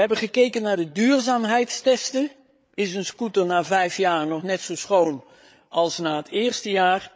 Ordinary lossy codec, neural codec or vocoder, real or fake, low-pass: none; codec, 16 kHz, 8 kbps, FreqCodec, larger model; fake; none